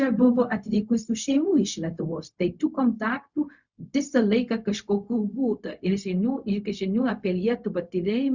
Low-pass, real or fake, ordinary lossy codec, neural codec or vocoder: 7.2 kHz; fake; Opus, 64 kbps; codec, 16 kHz, 0.4 kbps, LongCat-Audio-Codec